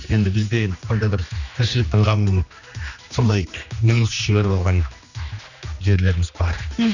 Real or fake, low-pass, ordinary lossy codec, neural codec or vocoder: fake; 7.2 kHz; none; codec, 16 kHz, 2 kbps, X-Codec, HuBERT features, trained on general audio